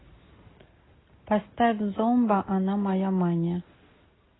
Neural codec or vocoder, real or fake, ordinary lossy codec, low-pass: none; real; AAC, 16 kbps; 7.2 kHz